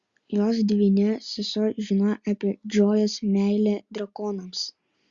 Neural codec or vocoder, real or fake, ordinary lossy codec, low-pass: none; real; Opus, 64 kbps; 7.2 kHz